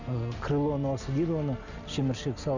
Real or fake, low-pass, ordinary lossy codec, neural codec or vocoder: real; 7.2 kHz; none; none